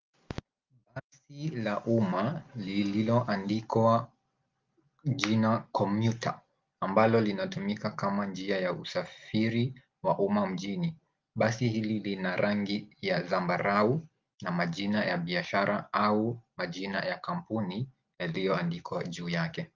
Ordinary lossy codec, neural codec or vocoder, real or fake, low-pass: Opus, 32 kbps; none; real; 7.2 kHz